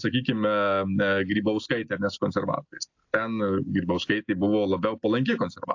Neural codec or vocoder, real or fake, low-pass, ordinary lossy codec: none; real; 7.2 kHz; AAC, 48 kbps